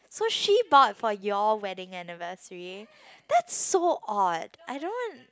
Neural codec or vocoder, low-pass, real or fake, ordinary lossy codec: none; none; real; none